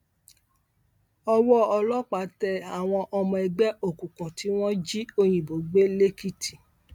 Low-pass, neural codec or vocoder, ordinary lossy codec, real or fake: 19.8 kHz; none; none; real